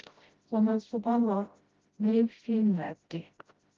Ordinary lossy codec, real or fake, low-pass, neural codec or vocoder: Opus, 24 kbps; fake; 7.2 kHz; codec, 16 kHz, 0.5 kbps, FreqCodec, smaller model